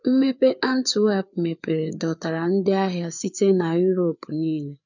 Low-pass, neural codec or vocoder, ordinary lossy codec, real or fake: 7.2 kHz; codec, 16 kHz, 4 kbps, FreqCodec, larger model; none; fake